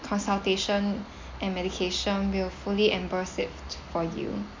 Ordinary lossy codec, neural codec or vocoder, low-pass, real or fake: MP3, 48 kbps; none; 7.2 kHz; real